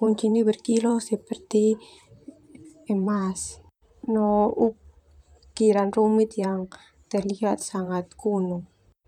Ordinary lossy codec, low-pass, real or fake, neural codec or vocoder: none; 19.8 kHz; fake; vocoder, 44.1 kHz, 128 mel bands, Pupu-Vocoder